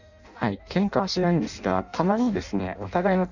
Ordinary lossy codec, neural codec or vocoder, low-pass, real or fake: none; codec, 16 kHz in and 24 kHz out, 0.6 kbps, FireRedTTS-2 codec; 7.2 kHz; fake